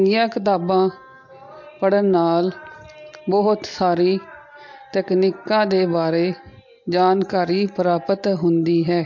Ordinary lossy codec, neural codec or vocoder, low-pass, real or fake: MP3, 48 kbps; none; 7.2 kHz; real